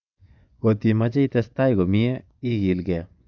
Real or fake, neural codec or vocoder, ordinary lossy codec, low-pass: fake; vocoder, 24 kHz, 100 mel bands, Vocos; none; 7.2 kHz